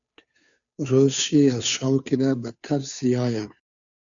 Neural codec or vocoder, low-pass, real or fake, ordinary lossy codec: codec, 16 kHz, 2 kbps, FunCodec, trained on Chinese and English, 25 frames a second; 7.2 kHz; fake; MP3, 96 kbps